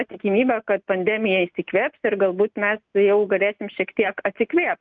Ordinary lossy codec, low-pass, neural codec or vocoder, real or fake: Opus, 24 kbps; 7.2 kHz; none; real